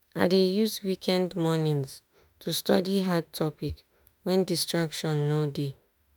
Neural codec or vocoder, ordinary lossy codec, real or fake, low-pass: autoencoder, 48 kHz, 32 numbers a frame, DAC-VAE, trained on Japanese speech; none; fake; none